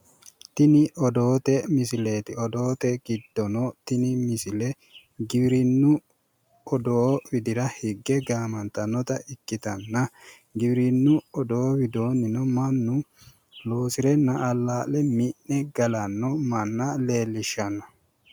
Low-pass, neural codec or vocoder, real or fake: 19.8 kHz; none; real